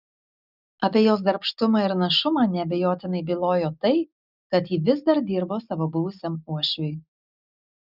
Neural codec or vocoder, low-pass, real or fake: none; 5.4 kHz; real